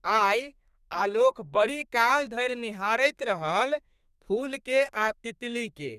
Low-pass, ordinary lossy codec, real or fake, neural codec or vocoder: 14.4 kHz; none; fake; codec, 32 kHz, 1.9 kbps, SNAC